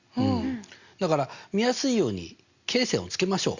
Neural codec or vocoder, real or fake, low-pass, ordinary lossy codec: none; real; 7.2 kHz; Opus, 64 kbps